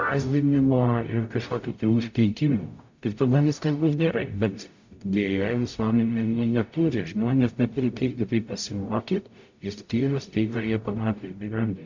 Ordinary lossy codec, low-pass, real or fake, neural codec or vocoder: MP3, 48 kbps; 7.2 kHz; fake; codec, 44.1 kHz, 0.9 kbps, DAC